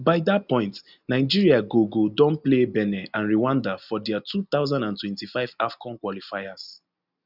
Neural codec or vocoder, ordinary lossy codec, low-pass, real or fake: none; none; 5.4 kHz; real